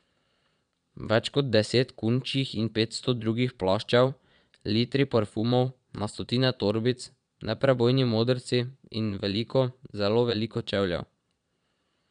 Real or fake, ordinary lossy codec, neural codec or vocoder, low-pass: fake; none; vocoder, 22.05 kHz, 80 mel bands, Vocos; 9.9 kHz